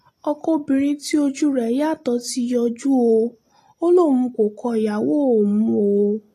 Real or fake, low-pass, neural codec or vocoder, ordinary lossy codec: real; 14.4 kHz; none; AAC, 48 kbps